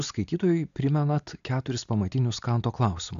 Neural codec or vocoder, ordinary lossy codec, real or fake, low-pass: none; AAC, 96 kbps; real; 7.2 kHz